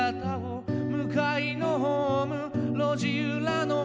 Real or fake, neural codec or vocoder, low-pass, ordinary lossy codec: real; none; none; none